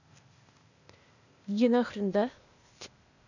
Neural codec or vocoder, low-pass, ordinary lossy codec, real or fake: codec, 16 kHz, 0.8 kbps, ZipCodec; 7.2 kHz; none; fake